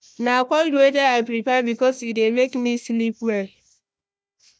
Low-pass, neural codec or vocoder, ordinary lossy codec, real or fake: none; codec, 16 kHz, 1 kbps, FunCodec, trained on Chinese and English, 50 frames a second; none; fake